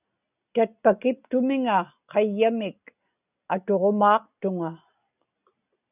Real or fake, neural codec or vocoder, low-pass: real; none; 3.6 kHz